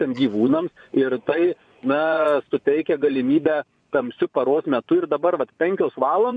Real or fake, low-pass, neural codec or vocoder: fake; 9.9 kHz; vocoder, 24 kHz, 100 mel bands, Vocos